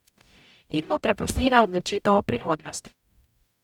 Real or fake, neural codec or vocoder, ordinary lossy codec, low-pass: fake; codec, 44.1 kHz, 0.9 kbps, DAC; none; 19.8 kHz